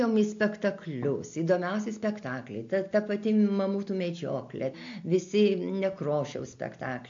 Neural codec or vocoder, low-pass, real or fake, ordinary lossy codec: none; 7.2 kHz; real; MP3, 48 kbps